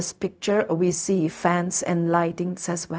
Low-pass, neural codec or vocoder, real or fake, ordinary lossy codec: none; codec, 16 kHz, 0.4 kbps, LongCat-Audio-Codec; fake; none